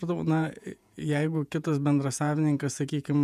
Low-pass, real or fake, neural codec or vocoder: 14.4 kHz; fake; vocoder, 48 kHz, 128 mel bands, Vocos